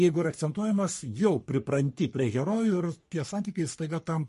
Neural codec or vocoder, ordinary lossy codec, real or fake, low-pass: codec, 44.1 kHz, 3.4 kbps, Pupu-Codec; MP3, 48 kbps; fake; 14.4 kHz